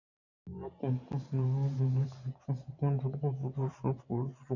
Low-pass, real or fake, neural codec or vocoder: 7.2 kHz; fake; vocoder, 44.1 kHz, 128 mel bands every 512 samples, BigVGAN v2